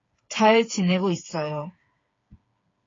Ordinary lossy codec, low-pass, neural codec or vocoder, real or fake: AAC, 32 kbps; 7.2 kHz; codec, 16 kHz, 8 kbps, FreqCodec, smaller model; fake